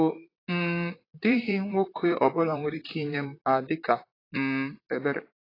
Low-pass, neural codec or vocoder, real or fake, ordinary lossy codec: 5.4 kHz; vocoder, 44.1 kHz, 128 mel bands, Pupu-Vocoder; fake; AAC, 24 kbps